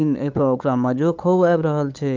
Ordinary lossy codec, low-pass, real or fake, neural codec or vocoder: Opus, 32 kbps; 7.2 kHz; fake; codec, 16 kHz, 8 kbps, FunCodec, trained on LibriTTS, 25 frames a second